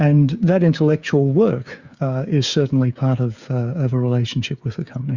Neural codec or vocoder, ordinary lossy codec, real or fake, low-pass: codec, 16 kHz, 8 kbps, FreqCodec, smaller model; Opus, 64 kbps; fake; 7.2 kHz